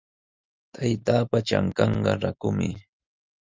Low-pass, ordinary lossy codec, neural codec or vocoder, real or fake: 7.2 kHz; Opus, 32 kbps; none; real